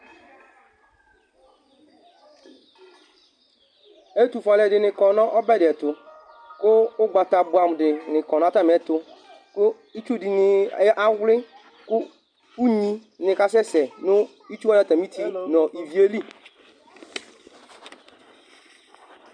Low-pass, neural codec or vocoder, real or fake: 9.9 kHz; none; real